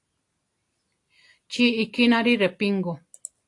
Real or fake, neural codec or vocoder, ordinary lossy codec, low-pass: real; none; AAC, 48 kbps; 10.8 kHz